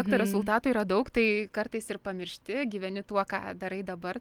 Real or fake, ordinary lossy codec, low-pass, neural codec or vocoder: real; Opus, 32 kbps; 19.8 kHz; none